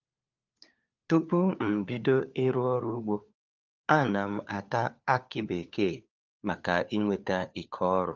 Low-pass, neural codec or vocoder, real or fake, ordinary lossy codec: 7.2 kHz; codec, 16 kHz, 4 kbps, FunCodec, trained on LibriTTS, 50 frames a second; fake; Opus, 24 kbps